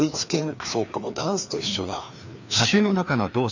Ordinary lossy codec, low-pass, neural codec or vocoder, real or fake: none; 7.2 kHz; codec, 16 kHz, 2 kbps, FreqCodec, larger model; fake